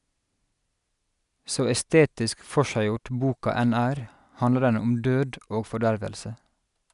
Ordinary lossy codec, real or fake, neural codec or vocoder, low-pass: none; real; none; 10.8 kHz